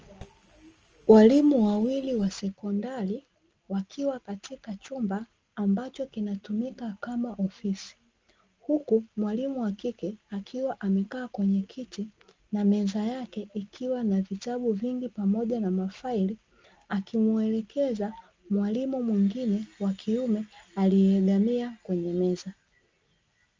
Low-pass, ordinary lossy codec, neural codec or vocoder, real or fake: 7.2 kHz; Opus, 24 kbps; none; real